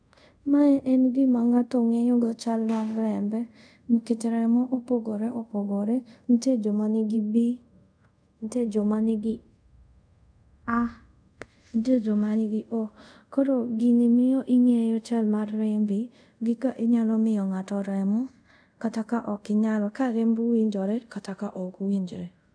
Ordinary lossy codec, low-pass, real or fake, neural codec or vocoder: none; 9.9 kHz; fake; codec, 24 kHz, 0.5 kbps, DualCodec